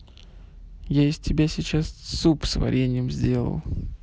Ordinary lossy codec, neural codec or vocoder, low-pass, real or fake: none; none; none; real